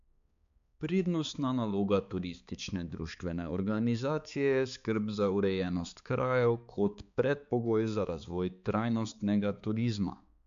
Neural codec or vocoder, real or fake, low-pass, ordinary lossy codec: codec, 16 kHz, 4 kbps, X-Codec, HuBERT features, trained on balanced general audio; fake; 7.2 kHz; MP3, 64 kbps